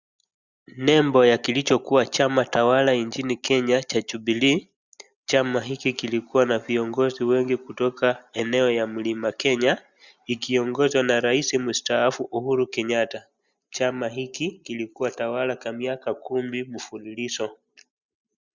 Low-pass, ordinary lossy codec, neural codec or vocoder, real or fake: 7.2 kHz; Opus, 64 kbps; none; real